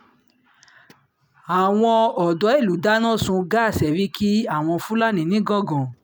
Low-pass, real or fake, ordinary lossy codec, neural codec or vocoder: none; real; none; none